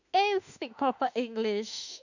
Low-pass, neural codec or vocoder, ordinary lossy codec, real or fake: 7.2 kHz; autoencoder, 48 kHz, 32 numbers a frame, DAC-VAE, trained on Japanese speech; none; fake